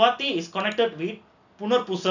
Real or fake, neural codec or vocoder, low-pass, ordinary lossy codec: real; none; 7.2 kHz; none